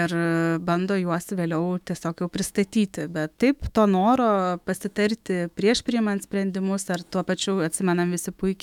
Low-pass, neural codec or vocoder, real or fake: 19.8 kHz; autoencoder, 48 kHz, 128 numbers a frame, DAC-VAE, trained on Japanese speech; fake